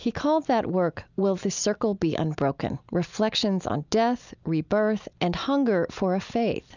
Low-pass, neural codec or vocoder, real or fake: 7.2 kHz; none; real